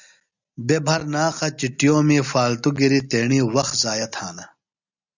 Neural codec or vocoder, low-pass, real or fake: none; 7.2 kHz; real